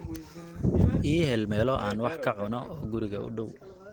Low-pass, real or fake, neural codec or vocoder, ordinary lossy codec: 19.8 kHz; real; none; Opus, 16 kbps